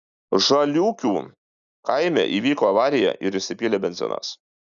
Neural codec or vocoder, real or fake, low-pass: none; real; 7.2 kHz